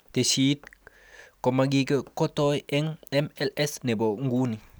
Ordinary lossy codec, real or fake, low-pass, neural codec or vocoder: none; real; none; none